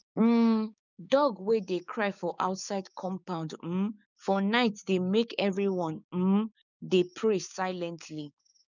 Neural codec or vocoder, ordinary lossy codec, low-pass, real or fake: codec, 16 kHz, 6 kbps, DAC; none; 7.2 kHz; fake